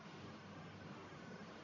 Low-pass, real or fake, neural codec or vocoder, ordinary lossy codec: 7.2 kHz; fake; codec, 44.1 kHz, 1.7 kbps, Pupu-Codec; MP3, 48 kbps